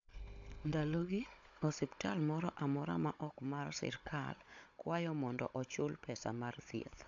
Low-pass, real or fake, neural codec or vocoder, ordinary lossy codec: 7.2 kHz; fake; codec, 16 kHz, 16 kbps, FunCodec, trained on Chinese and English, 50 frames a second; AAC, 64 kbps